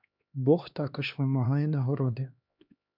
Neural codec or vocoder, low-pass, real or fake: codec, 16 kHz, 2 kbps, X-Codec, HuBERT features, trained on LibriSpeech; 5.4 kHz; fake